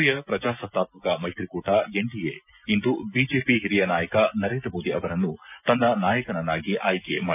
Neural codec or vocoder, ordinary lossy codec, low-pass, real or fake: none; none; 3.6 kHz; real